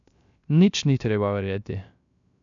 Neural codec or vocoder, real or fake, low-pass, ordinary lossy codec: codec, 16 kHz, 0.3 kbps, FocalCodec; fake; 7.2 kHz; none